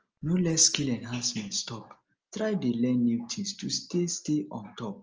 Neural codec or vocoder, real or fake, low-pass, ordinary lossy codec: none; real; 7.2 kHz; Opus, 24 kbps